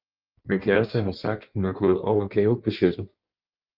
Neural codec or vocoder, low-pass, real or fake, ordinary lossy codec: codec, 16 kHz in and 24 kHz out, 1.1 kbps, FireRedTTS-2 codec; 5.4 kHz; fake; Opus, 32 kbps